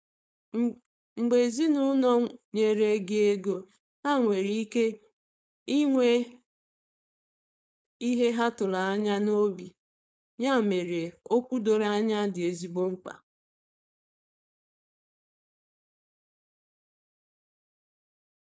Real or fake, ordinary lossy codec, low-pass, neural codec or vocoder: fake; none; none; codec, 16 kHz, 4.8 kbps, FACodec